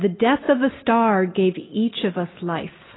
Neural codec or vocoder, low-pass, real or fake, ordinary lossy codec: none; 7.2 kHz; real; AAC, 16 kbps